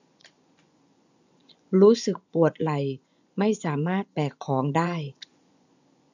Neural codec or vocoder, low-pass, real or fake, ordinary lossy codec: vocoder, 24 kHz, 100 mel bands, Vocos; 7.2 kHz; fake; none